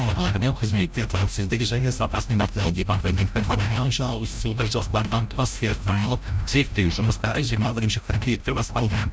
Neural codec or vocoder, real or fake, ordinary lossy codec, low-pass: codec, 16 kHz, 0.5 kbps, FreqCodec, larger model; fake; none; none